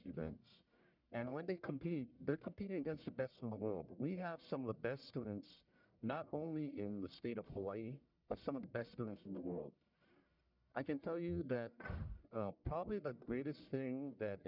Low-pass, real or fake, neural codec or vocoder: 5.4 kHz; fake; codec, 44.1 kHz, 1.7 kbps, Pupu-Codec